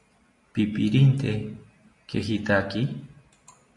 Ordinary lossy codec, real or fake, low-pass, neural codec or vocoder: MP3, 48 kbps; real; 10.8 kHz; none